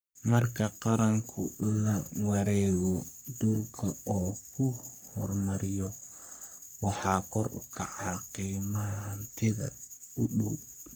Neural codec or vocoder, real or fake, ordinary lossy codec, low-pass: codec, 44.1 kHz, 3.4 kbps, Pupu-Codec; fake; none; none